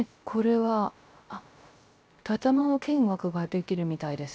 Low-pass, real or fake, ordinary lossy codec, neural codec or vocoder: none; fake; none; codec, 16 kHz, 0.3 kbps, FocalCodec